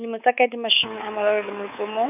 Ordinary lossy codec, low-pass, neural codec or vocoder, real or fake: none; 3.6 kHz; codec, 24 kHz, 3.1 kbps, DualCodec; fake